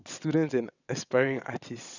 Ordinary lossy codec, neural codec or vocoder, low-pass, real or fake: none; vocoder, 22.05 kHz, 80 mel bands, WaveNeXt; 7.2 kHz; fake